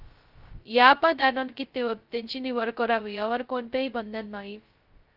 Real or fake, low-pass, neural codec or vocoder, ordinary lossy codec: fake; 5.4 kHz; codec, 16 kHz, 0.2 kbps, FocalCodec; Opus, 24 kbps